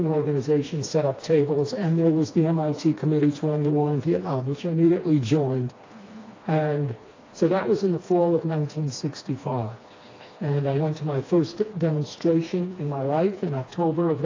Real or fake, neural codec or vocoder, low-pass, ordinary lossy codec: fake; codec, 16 kHz, 2 kbps, FreqCodec, smaller model; 7.2 kHz; AAC, 32 kbps